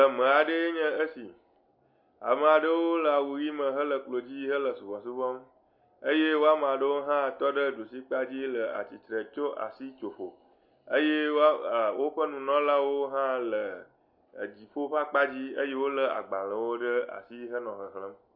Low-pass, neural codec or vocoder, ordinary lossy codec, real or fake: 5.4 kHz; none; MP3, 32 kbps; real